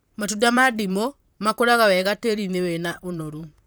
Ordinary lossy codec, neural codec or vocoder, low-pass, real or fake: none; vocoder, 44.1 kHz, 128 mel bands, Pupu-Vocoder; none; fake